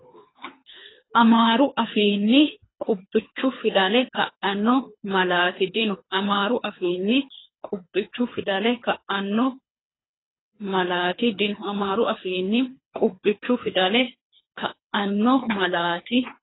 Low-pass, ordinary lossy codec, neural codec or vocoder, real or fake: 7.2 kHz; AAC, 16 kbps; codec, 24 kHz, 3 kbps, HILCodec; fake